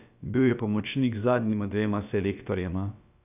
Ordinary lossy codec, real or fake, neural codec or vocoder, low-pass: none; fake; codec, 16 kHz, about 1 kbps, DyCAST, with the encoder's durations; 3.6 kHz